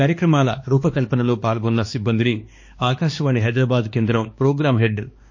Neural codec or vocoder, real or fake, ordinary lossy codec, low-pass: codec, 16 kHz, 2 kbps, X-Codec, HuBERT features, trained on balanced general audio; fake; MP3, 32 kbps; 7.2 kHz